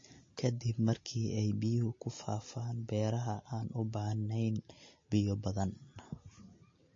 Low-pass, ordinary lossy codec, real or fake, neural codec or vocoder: 7.2 kHz; MP3, 32 kbps; real; none